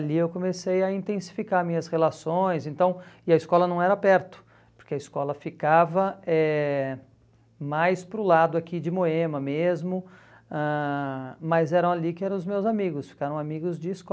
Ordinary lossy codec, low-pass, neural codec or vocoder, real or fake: none; none; none; real